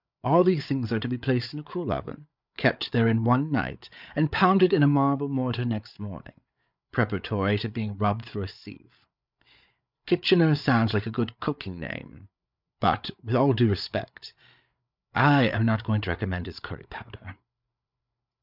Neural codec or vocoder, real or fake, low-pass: codec, 16 kHz, 8 kbps, FreqCodec, larger model; fake; 5.4 kHz